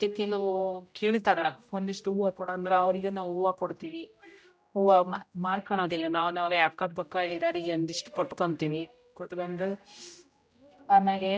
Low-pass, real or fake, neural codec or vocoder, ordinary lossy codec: none; fake; codec, 16 kHz, 0.5 kbps, X-Codec, HuBERT features, trained on general audio; none